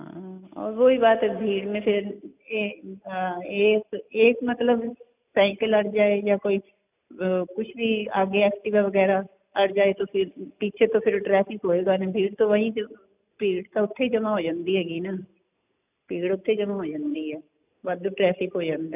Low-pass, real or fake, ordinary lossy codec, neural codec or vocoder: 3.6 kHz; real; none; none